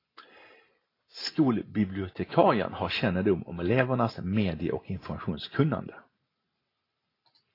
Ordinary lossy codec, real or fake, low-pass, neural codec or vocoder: AAC, 24 kbps; real; 5.4 kHz; none